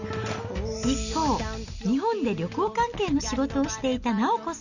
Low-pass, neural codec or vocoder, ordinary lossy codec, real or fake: 7.2 kHz; none; none; real